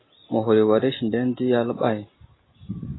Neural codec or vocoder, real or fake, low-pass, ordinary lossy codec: none; real; 7.2 kHz; AAC, 16 kbps